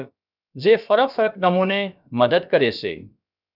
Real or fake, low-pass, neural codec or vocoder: fake; 5.4 kHz; codec, 16 kHz, about 1 kbps, DyCAST, with the encoder's durations